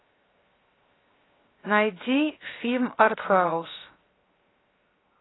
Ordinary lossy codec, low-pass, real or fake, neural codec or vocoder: AAC, 16 kbps; 7.2 kHz; fake; codec, 16 kHz, 0.7 kbps, FocalCodec